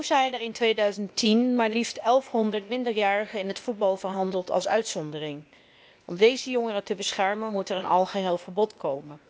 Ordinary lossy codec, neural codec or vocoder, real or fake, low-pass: none; codec, 16 kHz, 0.8 kbps, ZipCodec; fake; none